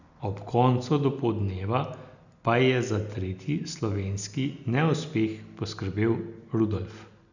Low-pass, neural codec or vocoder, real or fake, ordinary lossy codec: 7.2 kHz; none; real; none